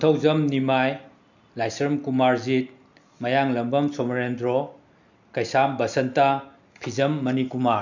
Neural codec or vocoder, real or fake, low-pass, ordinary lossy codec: none; real; 7.2 kHz; none